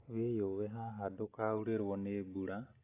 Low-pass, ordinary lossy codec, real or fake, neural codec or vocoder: 3.6 kHz; AAC, 24 kbps; real; none